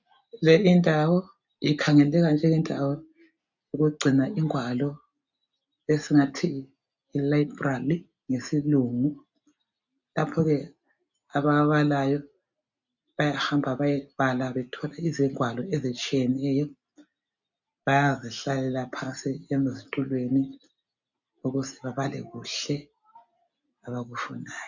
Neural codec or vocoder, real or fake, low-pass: none; real; 7.2 kHz